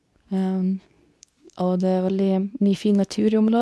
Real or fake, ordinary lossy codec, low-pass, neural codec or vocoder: fake; none; none; codec, 24 kHz, 0.9 kbps, WavTokenizer, medium speech release version 2